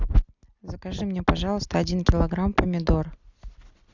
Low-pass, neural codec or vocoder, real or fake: 7.2 kHz; none; real